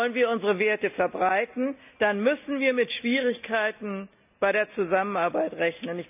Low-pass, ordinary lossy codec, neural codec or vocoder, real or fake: 3.6 kHz; none; none; real